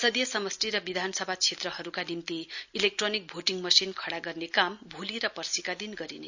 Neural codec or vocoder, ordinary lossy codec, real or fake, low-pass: none; none; real; 7.2 kHz